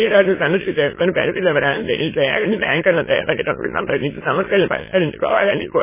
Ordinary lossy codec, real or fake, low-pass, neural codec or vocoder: MP3, 16 kbps; fake; 3.6 kHz; autoencoder, 22.05 kHz, a latent of 192 numbers a frame, VITS, trained on many speakers